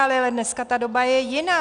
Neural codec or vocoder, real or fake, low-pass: none; real; 9.9 kHz